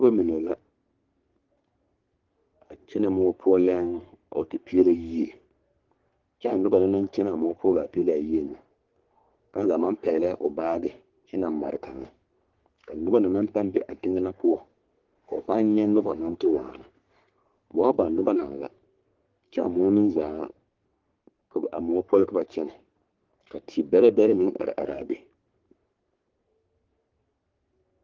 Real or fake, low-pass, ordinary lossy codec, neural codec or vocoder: fake; 7.2 kHz; Opus, 16 kbps; codec, 44.1 kHz, 3.4 kbps, Pupu-Codec